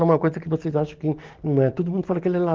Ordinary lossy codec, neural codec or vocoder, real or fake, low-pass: Opus, 16 kbps; none; real; 7.2 kHz